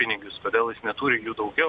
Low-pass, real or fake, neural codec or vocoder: 9.9 kHz; real; none